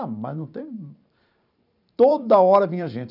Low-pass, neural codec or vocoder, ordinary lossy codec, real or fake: 5.4 kHz; vocoder, 44.1 kHz, 128 mel bands every 512 samples, BigVGAN v2; none; fake